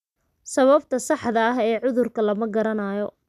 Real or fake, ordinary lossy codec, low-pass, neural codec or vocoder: real; none; 14.4 kHz; none